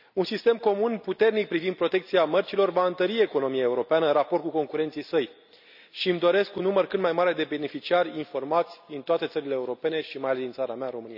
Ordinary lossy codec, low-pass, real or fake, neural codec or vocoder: none; 5.4 kHz; real; none